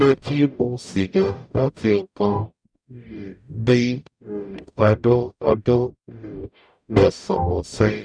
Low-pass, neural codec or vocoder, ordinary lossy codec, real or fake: 9.9 kHz; codec, 44.1 kHz, 0.9 kbps, DAC; none; fake